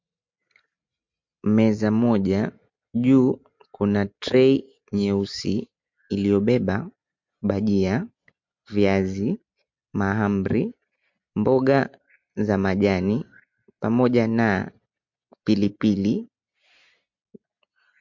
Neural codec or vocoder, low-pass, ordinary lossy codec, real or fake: none; 7.2 kHz; MP3, 48 kbps; real